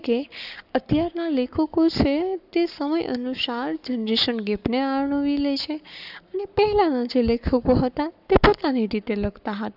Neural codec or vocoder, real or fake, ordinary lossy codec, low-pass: codec, 44.1 kHz, 7.8 kbps, Pupu-Codec; fake; none; 5.4 kHz